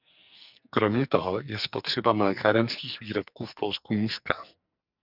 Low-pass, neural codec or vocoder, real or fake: 5.4 kHz; codec, 44.1 kHz, 2.6 kbps, SNAC; fake